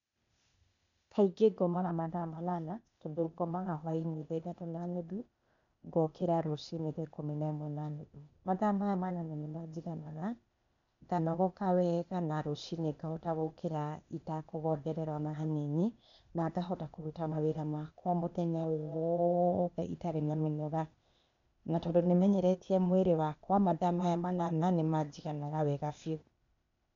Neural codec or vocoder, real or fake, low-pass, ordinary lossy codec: codec, 16 kHz, 0.8 kbps, ZipCodec; fake; 7.2 kHz; MP3, 64 kbps